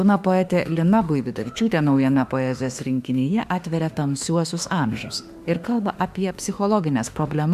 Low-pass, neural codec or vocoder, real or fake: 14.4 kHz; autoencoder, 48 kHz, 32 numbers a frame, DAC-VAE, trained on Japanese speech; fake